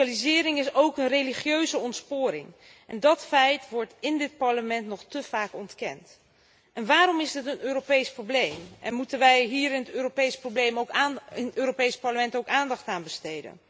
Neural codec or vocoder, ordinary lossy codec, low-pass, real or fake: none; none; none; real